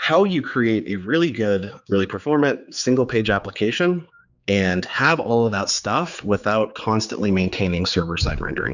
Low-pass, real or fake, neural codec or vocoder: 7.2 kHz; fake; codec, 16 kHz, 4 kbps, X-Codec, HuBERT features, trained on general audio